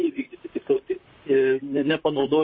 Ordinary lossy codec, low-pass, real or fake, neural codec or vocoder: MP3, 24 kbps; 7.2 kHz; fake; vocoder, 44.1 kHz, 128 mel bands, Pupu-Vocoder